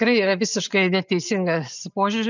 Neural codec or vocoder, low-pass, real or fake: none; 7.2 kHz; real